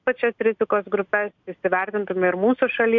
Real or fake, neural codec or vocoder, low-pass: real; none; 7.2 kHz